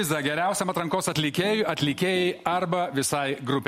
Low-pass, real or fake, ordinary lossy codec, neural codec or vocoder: 19.8 kHz; real; MP3, 64 kbps; none